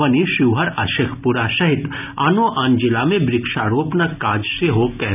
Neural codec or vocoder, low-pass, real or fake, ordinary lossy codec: none; 3.6 kHz; real; none